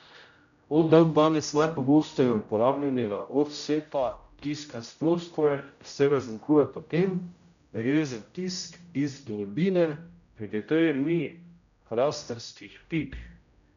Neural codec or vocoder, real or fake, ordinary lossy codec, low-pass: codec, 16 kHz, 0.5 kbps, X-Codec, HuBERT features, trained on general audio; fake; none; 7.2 kHz